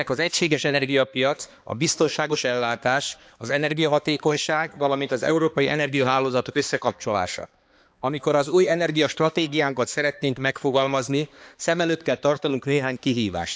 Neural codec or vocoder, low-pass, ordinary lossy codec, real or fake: codec, 16 kHz, 2 kbps, X-Codec, HuBERT features, trained on balanced general audio; none; none; fake